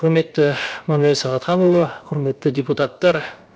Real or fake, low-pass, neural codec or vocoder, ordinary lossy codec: fake; none; codec, 16 kHz, about 1 kbps, DyCAST, with the encoder's durations; none